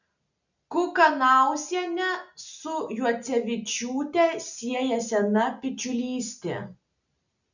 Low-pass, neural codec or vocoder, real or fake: 7.2 kHz; none; real